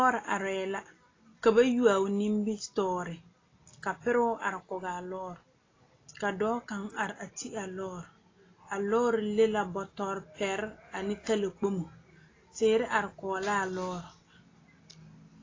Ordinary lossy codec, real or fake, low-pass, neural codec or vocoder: AAC, 32 kbps; real; 7.2 kHz; none